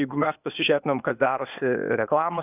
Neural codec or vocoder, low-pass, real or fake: codec, 16 kHz, 0.8 kbps, ZipCodec; 3.6 kHz; fake